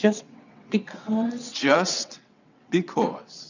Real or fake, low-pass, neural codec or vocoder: fake; 7.2 kHz; vocoder, 22.05 kHz, 80 mel bands, WaveNeXt